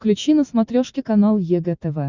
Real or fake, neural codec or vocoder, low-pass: real; none; 7.2 kHz